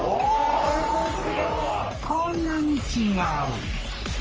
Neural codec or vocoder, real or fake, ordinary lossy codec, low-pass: codec, 44.1 kHz, 3.4 kbps, Pupu-Codec; fake; Opus, 24 kbps; 7.2 kHz